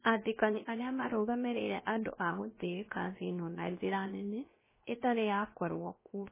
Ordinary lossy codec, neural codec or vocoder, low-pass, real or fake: MP3, 16 kbps; codec, 16 kHz, 0.7 kbps, FocalCodec; 3.6 kHz; fake